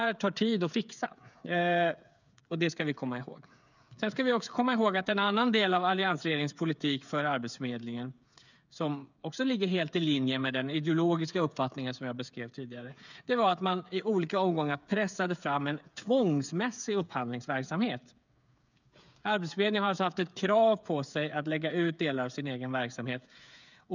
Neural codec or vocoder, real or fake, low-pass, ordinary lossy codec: codec, 16 kHz, 8 kbps, FreqCodec, smaller model; fake; 7.2 kHz; none